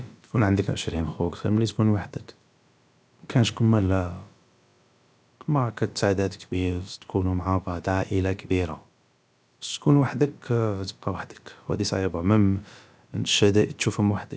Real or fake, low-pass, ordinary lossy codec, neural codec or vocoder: fake; none; none; codec, 16 kHz, about 1 kbps, DyCAST, with the encoder's durations